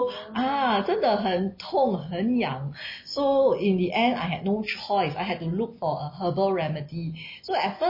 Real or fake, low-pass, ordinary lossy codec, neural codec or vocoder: real; 5.4 kHz; MP3, 24 kbps; none